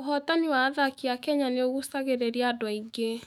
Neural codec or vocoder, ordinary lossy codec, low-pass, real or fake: autoencoder, 48 kHz, 128 numbers a frame, DAC-VAE, trained on Japanese speech; none; 19.8 kHz; fake